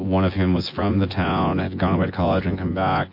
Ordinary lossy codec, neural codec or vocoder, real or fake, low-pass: MP3, 32 kbps; vocoder, 24 kHz, 100 mel bands, Vocos; fake; 5.4 kHz